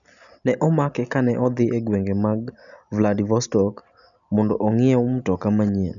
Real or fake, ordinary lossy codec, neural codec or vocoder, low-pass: real; none; none; 7.2 kHz